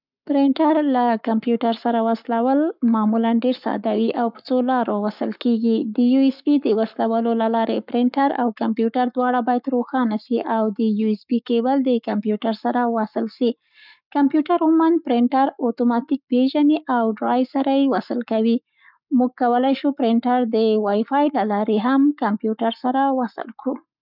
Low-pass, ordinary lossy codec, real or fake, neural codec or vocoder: 5.4 kHz; none; fake; codec, 44.1 kHz, 7.8 kbps, Pupu-Codec